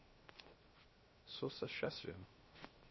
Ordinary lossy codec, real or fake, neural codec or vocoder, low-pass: MP3, 24 kbps; fake; codec, 16 kHz, 0.7 kbps, FocalCodec; 7.2 kHz